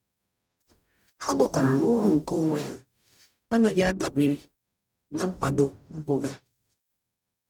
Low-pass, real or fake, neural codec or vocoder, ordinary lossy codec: 19.8 kHz; fake; codec, 44.1 kHz, 0.9 kbps, DAC; none